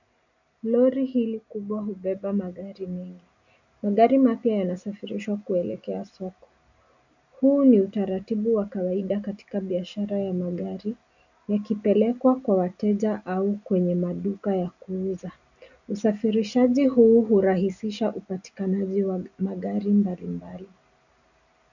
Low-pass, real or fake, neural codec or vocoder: 7.2 kHz; real; none